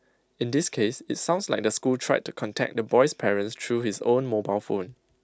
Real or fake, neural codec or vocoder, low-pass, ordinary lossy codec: real; none; none; none